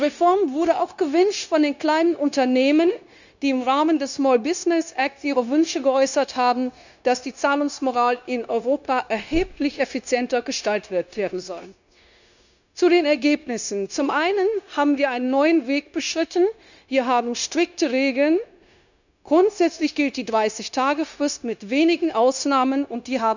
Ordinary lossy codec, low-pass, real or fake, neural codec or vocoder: none; 7.2 kHz; fake; codec, 16 kHz, 0.9 kbps, LongCat-Audio-Codec